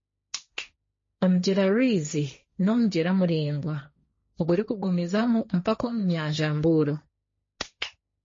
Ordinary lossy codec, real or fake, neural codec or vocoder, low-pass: MP3, 32 kbps; fake; codec, 16 kHz, 1.1 kbps, Voila-Tokenizer; 7.2 kHz